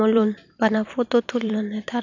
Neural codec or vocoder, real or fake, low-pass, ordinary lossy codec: vocoder, 44.1 kHz, 128 mel bands every 256 samples, BigVGAN v2; fake; 7.2 kHz; none